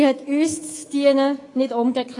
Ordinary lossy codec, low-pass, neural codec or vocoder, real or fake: AAC, 32 kbps; 10.8 kHz; codec, 24 kHz, 3.1 kbps, DualCodec; fake